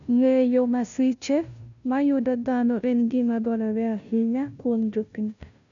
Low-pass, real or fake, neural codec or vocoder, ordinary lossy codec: 7.2 kHz; fake; codec, 16 kHz, 0.5 kbps, FunCodec, trained on Chinese and English, 25 frames a second; none